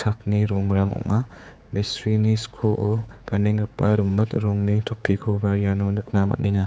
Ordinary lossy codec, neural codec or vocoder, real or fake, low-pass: none; codec, 16 kHz, 4 kbps, X-Codec, HuBERT features, trained on general audio; fake; none